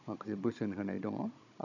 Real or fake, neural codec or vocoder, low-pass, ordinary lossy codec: fake; codec, 16 kHz, 16 kbps, FunCodec, trained on Chinese and English, 50 frames a second; 7.2 kHz; none